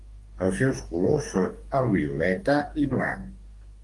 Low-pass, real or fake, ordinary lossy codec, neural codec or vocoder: 10.8 kHz; fake; Opus, 24 kbps; codec, 44.1 kHz, 2.6 kbps, DAC